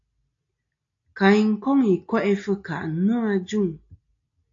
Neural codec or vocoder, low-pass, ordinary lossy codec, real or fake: none; 7.2 kHz; AAC, 48 kbps; real